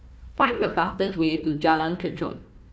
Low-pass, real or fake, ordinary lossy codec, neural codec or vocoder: none; fake; none; codec, 16 kHz, 1 kbps, FunCodec, trained on Chinese and English, 50 frames a second